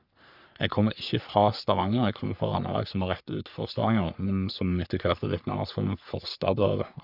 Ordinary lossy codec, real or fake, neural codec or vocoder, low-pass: none; fake; codec, 44.1 kHz, 3.4 kbps, Pupu-Codec; 5.4 kHz